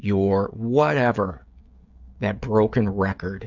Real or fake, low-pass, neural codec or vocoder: fake; 7.2 kHz; codec, 16 kHz, 16 kbps, FreqCodec, smaller model